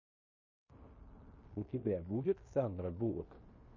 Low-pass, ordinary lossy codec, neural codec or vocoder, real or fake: 7.2 kHz; MP3, 32 kbps; codec, 16 kHz in and 24 kHz out, 0.9 kbps, LongCat-Audio-Codec, fine tuned four codebook decoder; fake